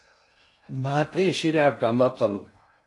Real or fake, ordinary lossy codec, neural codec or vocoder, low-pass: fake; AAC, 48 kbps; codec, 16 kHz in and 24 kHz out, 0.6 kbps, FocalCodec, streaming, 2048 codes; 10.8 kHz